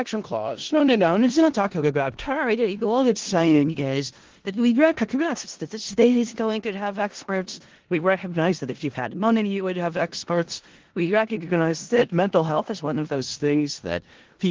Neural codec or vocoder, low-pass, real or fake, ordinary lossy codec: codec, 16 kHz in and 24 kHz out, 0.4 kbps, LongCat-Audio-Codec, four codebook decoder; 7.2 kHz; fake; Opus, 16 kbps